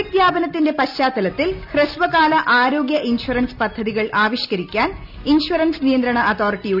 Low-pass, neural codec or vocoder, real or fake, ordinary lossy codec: 5.4 kHz; none; real; none